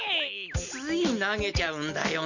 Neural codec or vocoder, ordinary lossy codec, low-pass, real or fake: none; none; 7.2 kHz; real